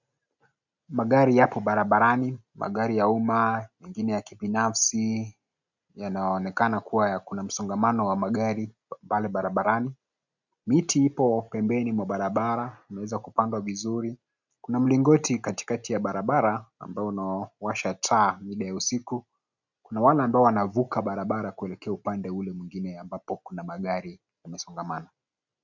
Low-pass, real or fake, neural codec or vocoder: 7.2 kHz; real; none